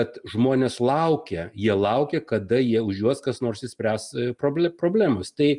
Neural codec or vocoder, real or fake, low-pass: none; real; 10.8 kHz